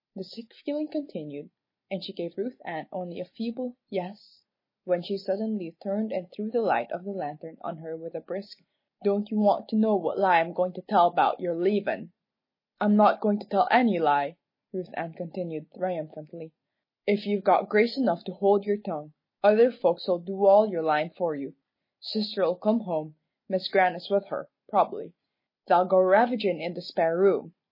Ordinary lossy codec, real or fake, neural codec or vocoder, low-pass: MP3, 24 kbps; real; none; 5.4 kHz